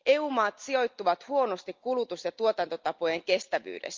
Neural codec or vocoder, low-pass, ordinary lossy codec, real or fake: none; 7.2 kHz; Opus, 32 kbps; real